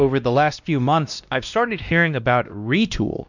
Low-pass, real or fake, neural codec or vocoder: 7.2 kHz; fake; codec, 16 kHz, 1 kbps, X-Codec, WavLM features, trained on Multilingual LibriSpeech